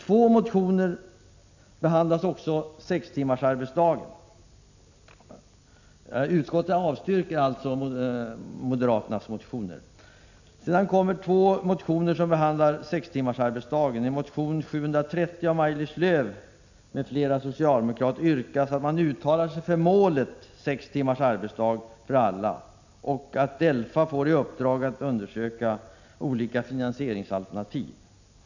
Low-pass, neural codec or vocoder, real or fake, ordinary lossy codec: 7.2 kHz; none; real; none